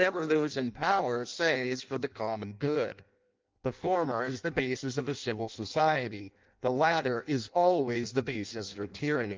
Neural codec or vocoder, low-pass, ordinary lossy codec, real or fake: codec, 16 kHz in and 24 kHz out, 0.6 kbps, FireRedTTS-2 codec; 7.2 kHz; Opus, 24 kbps; fake